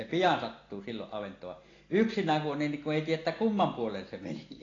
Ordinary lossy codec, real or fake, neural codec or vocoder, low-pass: AAC, 32 kbps; real; none; 7.2 kHz